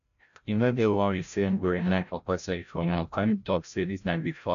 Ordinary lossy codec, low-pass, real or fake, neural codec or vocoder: none; 7.2 kHz; fake; codec, 16 kHz, 0.5 kbps, FreqCodec, larger model